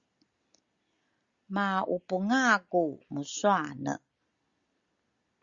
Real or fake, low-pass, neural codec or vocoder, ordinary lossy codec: real; 7.2 kHz; none; Opus, 64 kbps